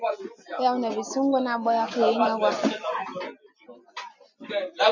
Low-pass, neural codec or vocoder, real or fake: 7.2 kHz; none; real